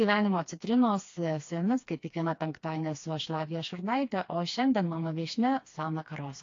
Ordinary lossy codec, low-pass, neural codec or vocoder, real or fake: AAC, 48 kbps; 7.2 kHz; codec, 16 kHz, 2 kbps, FreqCodec, smaller model; fake